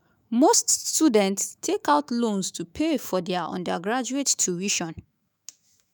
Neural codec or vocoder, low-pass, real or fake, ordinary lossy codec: autoencoder, 48 kHz, 128 numbers a frame, DAC-VAE, trained on Japanese speech; none; fake; none